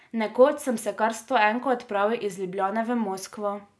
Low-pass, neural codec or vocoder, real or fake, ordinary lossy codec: none; none; real; none